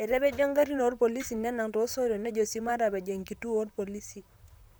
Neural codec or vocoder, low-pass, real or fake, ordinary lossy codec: vocoder, 44.1 kHz, 128 mel bands, Pupu-Vocoder; none; fake; none